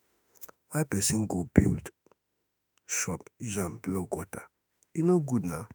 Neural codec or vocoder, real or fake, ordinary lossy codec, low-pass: autoencoder, 48 kHz, 32 numbers a frame, DAC-VAE, trained on Japanese speech; fake; none; none